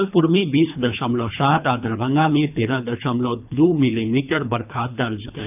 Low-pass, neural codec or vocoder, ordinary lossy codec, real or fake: 3.6 kHz; codec, 24 kHz, 3 kbps, HILCodec; none; fake